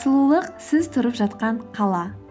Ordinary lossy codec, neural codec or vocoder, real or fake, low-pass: none; none; real; none